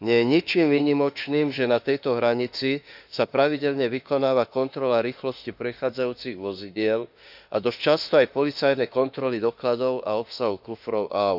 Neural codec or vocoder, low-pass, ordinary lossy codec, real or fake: autoencoder, 48 kHz, 32 numbers a frame, DAC-VAE, trained on Japanese speech; 5.4 kHz; none; fake